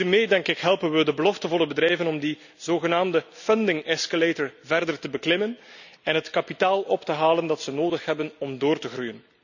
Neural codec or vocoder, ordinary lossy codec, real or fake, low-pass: none; none; real; 7.2 kHz